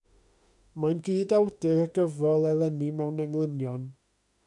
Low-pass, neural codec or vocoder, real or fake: 10.8 kHz; autoencoder, 48 kHz, 32 numbers a frame, DAC-VAE, trained on Japanese speech; fake